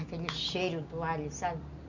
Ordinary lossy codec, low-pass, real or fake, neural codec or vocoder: none; 7.2 kHz; real; none